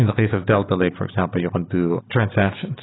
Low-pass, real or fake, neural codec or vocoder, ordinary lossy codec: 7.2 kHz; real; none; AAC, 16 kbps